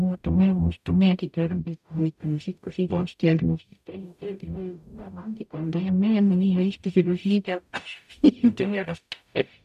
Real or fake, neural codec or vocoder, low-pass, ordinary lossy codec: fake; codec, 44.1 kHz, 0.9 kbps, DAC; 14.4 kHz; none